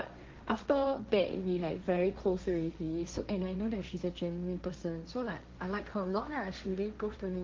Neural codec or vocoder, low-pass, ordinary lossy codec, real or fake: codec, 16 kHz, 1.1 kbps, Voila-Tokenizer; 7.2 kHz; Opus, 24 kbps; fake